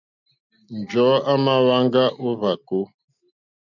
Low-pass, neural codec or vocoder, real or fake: 7.2 kHz; none; real